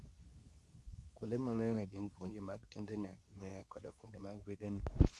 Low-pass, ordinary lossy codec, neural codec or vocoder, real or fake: none; none; codec, 24 kHz, 0.9 kbps, WavTokenizer, medium speech release version 2; fake